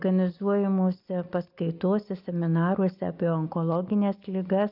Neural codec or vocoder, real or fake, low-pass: none; real; 5.4 kHz